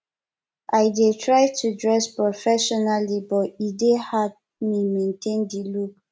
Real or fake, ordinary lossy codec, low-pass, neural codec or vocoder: real; none; none; none